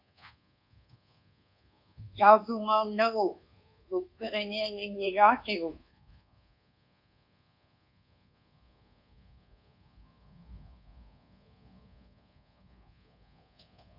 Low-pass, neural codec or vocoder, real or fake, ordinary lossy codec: 5.4 kHz; codec, 24 kHz, 1.2 kbps, DualCodec; fake; MP3, 48 kbps